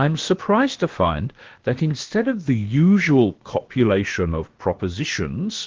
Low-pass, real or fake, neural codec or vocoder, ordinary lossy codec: 7.2 kHz; fake; codec, 16 kHz, about 1 kbps, DyCAST, with the encoder's durations; Opus, 16 kbps